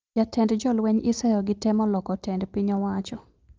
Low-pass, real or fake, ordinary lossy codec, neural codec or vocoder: 7.2 kHz; real; Opus, 24 kbps; none